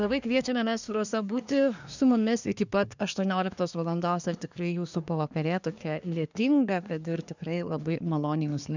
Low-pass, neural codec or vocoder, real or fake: 7.2 kHz; codec, 24 kHz, 1 kbps, SNAC; fake